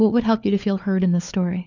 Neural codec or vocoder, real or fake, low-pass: codec, 16 kHz, 4 kbps, FunCodec, trained on LibriTTS, 50 frames a second; fake; 7.2 kHz